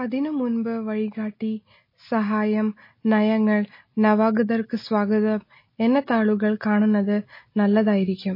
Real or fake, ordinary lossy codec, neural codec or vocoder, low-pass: real; MP3, 24 kbps; none; 5.4 kHz